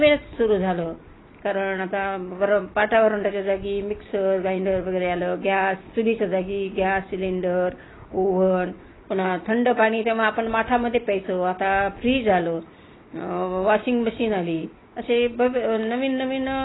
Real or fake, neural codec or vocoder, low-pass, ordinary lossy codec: real; none; 7.2 kHz; AAC, 16 kbps